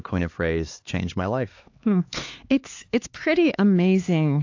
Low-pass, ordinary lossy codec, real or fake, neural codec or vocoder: 7.2 kHz; AAC, 48 kbps; fake; codec, 16 kHz, 2 kbps, X-Codec, HuBERT features, trained on LibriSpeech